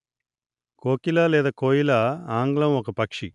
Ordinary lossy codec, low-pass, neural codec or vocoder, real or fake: AAC, 96 kbps; 10.8 kHz; none; real